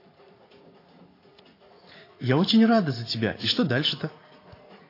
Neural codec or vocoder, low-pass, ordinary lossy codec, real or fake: vocoder, 44.1 kHz, 80 mel bands, Vocos; 5.4 kHz; AAC, 24 kbps; fake